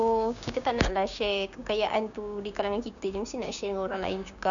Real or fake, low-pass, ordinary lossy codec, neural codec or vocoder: fake; 7.2 kHz; none; codec, 16 kHz, 6 kbps, DAC